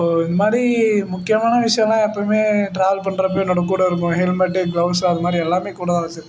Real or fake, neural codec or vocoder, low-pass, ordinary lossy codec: real; none; none; none